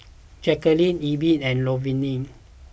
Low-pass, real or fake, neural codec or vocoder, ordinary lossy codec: none; real; none; none